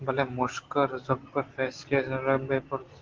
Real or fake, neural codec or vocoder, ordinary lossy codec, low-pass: real; none; Opus, 16 kbps; 7.2 kHz